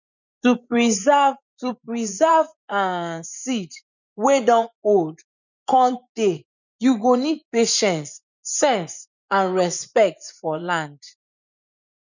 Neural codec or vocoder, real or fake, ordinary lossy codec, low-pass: vocoder, 24 kHz, 100 mel bands, Vocos; fake; none; 7.2 kHz